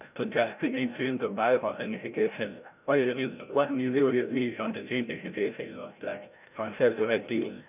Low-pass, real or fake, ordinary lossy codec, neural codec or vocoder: 3.6 kHz; fake; none; codec, 16 kHz, 0.5 kbps, FreqCodec, larger model